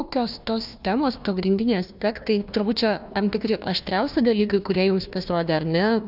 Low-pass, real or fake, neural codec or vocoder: 5.4 kHz; fake; codec, 16 kHz, 1 kbps, FunCodec, trained on Chinese and English, 50 frames a second